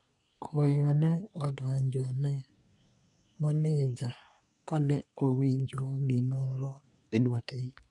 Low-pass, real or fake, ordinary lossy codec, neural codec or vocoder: 10.8 kHz; fake; none; codec, 24 kHz, 1 kbps, SNAC